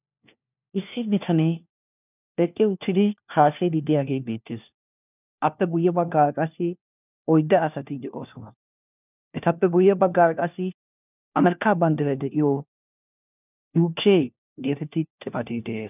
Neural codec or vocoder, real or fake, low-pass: codec, 16 kHz, 1 kbps, FunCodec, trained on LibriTTS, 50 frames a second; fake; 3.6 kHz